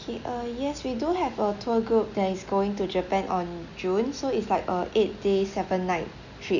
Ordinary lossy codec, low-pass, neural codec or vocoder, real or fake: none; 7.2 kHz; none; real